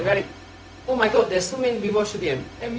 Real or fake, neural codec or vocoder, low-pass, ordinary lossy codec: fake; codec, 16 kHz, 0.4 kbps, LongCat-Audio-Codec; none; none